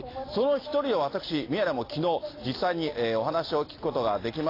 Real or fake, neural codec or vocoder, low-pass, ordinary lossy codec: real; none; 5.4 kHz; AAC, 24 kbps